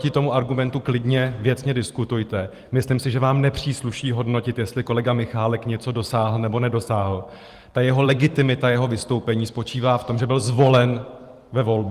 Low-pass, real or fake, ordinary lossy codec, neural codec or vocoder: 14.4 kHz; fake; Opus, 24 kbps; vocoder, 44.1 kHz, 128 mel bands every 512 samples, BigVGAN v2